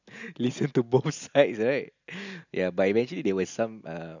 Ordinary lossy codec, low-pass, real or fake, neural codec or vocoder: none; 7.2 kHz; real; none